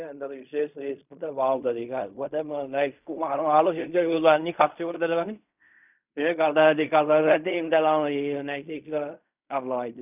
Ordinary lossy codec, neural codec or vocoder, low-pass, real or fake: none; codec, 16 kHz in and 24 kHz out, 0.4 kbps, LongCat-Audio-Codec, fine tuned four codebook decoder; 3.6 kHz; fake